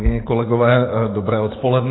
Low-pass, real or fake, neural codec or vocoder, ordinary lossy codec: 7.2 kHz; fake; codec, 44.1 kHz, 7.8 kbps, DAC; AAC, 16 kbps